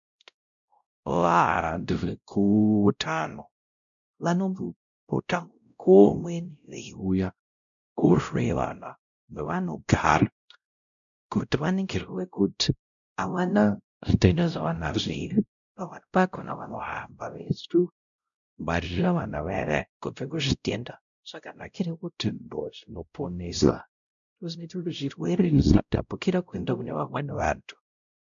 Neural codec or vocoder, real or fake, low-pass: codec, 16 kHz, 0.5 kbps, X-Codec, WavLM features, trained on Multilingual LibriSpeech; fake; 7.2 kHz